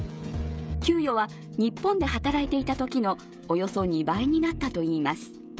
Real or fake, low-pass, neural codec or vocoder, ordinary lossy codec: fake; none; codec, 16 kHz, 16 kbps, FreqCodec, smaller model; none